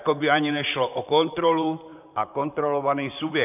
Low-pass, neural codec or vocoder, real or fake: 3.6 kHz; vocoder, 44.1 kHz, 128 mel bands, Pupu-Vocoder; fake